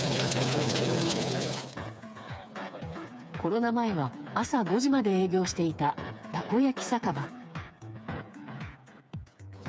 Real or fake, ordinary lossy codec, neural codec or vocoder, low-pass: fake; none; codec, 16 kHz, 4 kbps, FreqCodec, smaller model; none